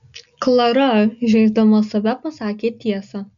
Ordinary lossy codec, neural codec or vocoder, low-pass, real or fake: MP3, 96 kbps; none; 7.2 kHz; real